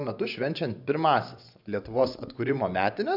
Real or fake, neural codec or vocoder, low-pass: fake; vocoder, 24 kHz, 100 mel bands, Vocos; 5.4 kHz